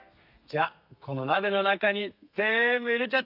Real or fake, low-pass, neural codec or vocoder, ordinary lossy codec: fake; 5.4 kHz; codec, 32 kHz, 1.9 kbps, SNAC; none